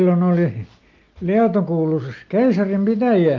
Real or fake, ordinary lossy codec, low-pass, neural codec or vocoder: real; Opus, 32 kbps; 7.2 kHz; none